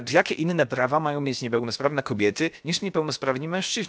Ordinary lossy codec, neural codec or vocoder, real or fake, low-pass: none; codec, 16 kHz, about 1 kbps, DyCAST, with the encoder's durations; fake; none